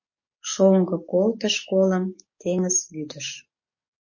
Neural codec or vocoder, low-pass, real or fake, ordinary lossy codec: codec, 44.1 kHz, 7.8 kbps, DAC; 7.2 kHz; fake; MP3, 32 kbps